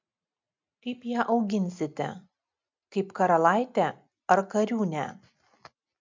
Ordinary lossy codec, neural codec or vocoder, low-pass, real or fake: MP3, 64 kbps; none; 7.2 kHz; real